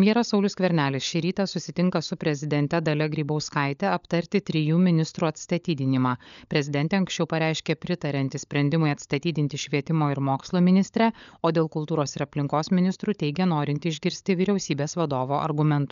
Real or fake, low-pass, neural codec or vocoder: fake; 7.2 kHz; codec, 16 kHz, 16 kbps, FunCodec, trained on LibriTTS, 50 frames a second